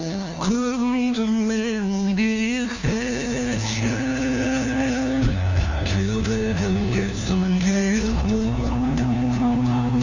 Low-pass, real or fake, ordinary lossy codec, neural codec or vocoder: 7.2 kHz; fake; none; codec, 16 kHz, 1 kbps, FunCodec, trained on LibriTTS, 50 frames a second